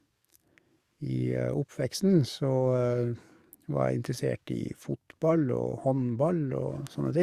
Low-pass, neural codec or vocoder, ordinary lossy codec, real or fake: 14.4 kHz; codec, 44.1 kHz, 7.8 kbps, DAC; Opus, 64 kbps; fake